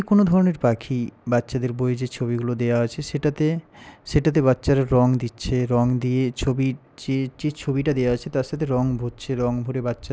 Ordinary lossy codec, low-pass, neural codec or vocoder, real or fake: none; none; none; real